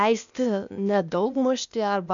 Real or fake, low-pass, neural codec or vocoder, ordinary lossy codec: fake; 7.2 kHz; codec, 16 kHz, 0.8 kbps, ZipCodec; MP3, 96 kbps